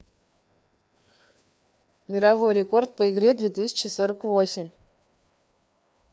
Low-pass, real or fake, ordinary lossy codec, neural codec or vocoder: none; fake; none; codec, 16 kHz, 2 kbps, FreqCodec, larger model